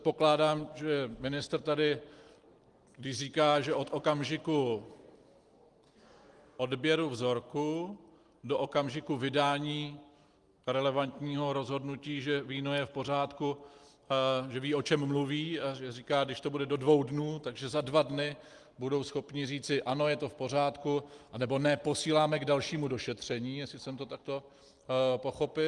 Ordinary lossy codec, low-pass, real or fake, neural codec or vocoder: Opus, 24 kbps; 10.8 kHz; real; none